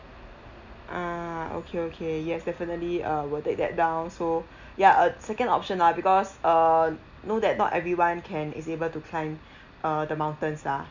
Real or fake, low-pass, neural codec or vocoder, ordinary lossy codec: real; 7.2 kHz; none; none